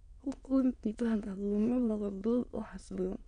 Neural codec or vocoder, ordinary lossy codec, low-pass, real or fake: autoencoder, 22.05 kHz, a latent of 192 numbers a frame, VITS, trained on many speakers; none; 9.9 kHz; fake